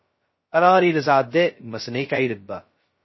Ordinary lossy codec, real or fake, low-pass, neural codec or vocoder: MP3, 24 kbps; fake; 7.2 kHz; codec, 16 kHz, 0.2 kbps, FocalCodec